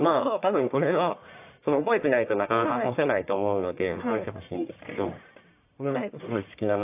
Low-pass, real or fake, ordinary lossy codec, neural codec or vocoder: 3.6 kHz; fake; AAC, 32 kbps; codec, 44.1 kHz, 1.7 kbps, Pupu-Codec